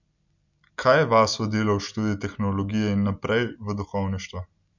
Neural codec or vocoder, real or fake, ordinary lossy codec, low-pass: none; real; none; 7.2 kHz